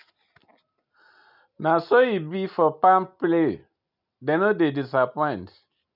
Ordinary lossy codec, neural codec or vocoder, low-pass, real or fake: none; none; 5.4 kHz; real